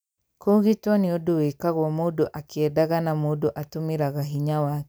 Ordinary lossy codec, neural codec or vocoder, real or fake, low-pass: none; none; real; none